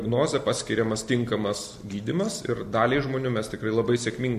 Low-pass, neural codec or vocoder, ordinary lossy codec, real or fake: 14.4 kHz; none; MP3, 64 kbps; real